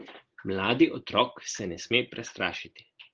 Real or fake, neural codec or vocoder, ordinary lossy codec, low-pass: real; none; Opus, 16 kbps; 7.2 kHz